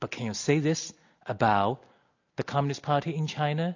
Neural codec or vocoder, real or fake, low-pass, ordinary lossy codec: none; real; 7.2 kHz; AAC, 48 kbps